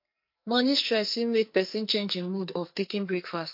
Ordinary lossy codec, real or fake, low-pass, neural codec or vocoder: MP3, 32 kbps; fake; 5.4 kHz; codec, 44.1 kHz, 2.6 kbps, SNAC